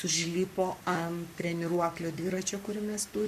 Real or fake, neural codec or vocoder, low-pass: fake; codec, 44.1 kHz, 7.8 kbps, Pupu-Codec; 14.4 kHz